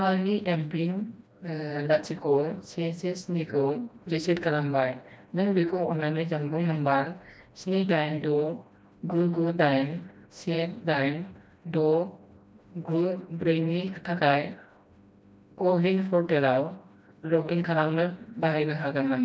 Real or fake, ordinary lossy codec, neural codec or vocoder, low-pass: fake; none; codec, 16 kHz, 1 kbps, FreqCodec, smaller model; none